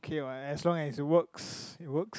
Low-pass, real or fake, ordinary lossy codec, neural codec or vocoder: none; real; none; none